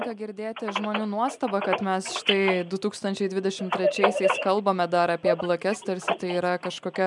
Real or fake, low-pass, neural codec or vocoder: real; 10.8 kHz; none